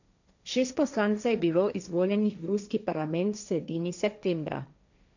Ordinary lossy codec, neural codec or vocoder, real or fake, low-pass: none; codec, 16 kHz, 1.1 kbps, Voila-Tokenizer; fake; 7.2 kHz